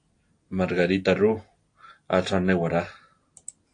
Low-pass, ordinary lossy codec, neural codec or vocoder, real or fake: 9.9 kHz; AAC, 48 kbps; none; real